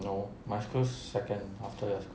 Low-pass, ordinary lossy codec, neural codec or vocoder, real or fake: none; none; none; real